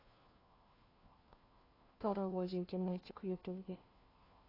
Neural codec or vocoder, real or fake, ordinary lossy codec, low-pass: codec, 16 kHz, 1 kbps, FunCodec, trained on LibriTTS, 50 frames a second; fake; AAC, 24 kbps; 5.4 kHz